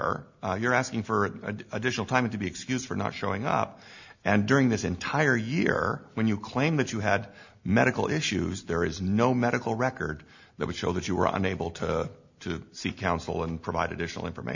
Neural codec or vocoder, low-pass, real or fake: none; 7.2 kHz; real